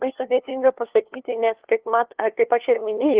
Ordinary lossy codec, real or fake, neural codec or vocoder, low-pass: Opus, 16 kbps; fake; codec, 16 kHz, 2 kbps, FunCodec, trained on LibriTTS, 25 frames a second; 3.6 kHz